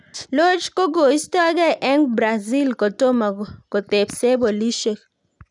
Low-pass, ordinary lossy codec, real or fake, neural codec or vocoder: 10.8 kHz; none; real; none